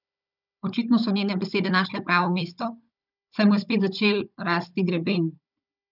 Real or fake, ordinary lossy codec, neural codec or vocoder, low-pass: fake; none; codec, 16 kHz, 16 kbps, FunCodec, trained on Chinese and English, 50 frames a second; 5.4 kHz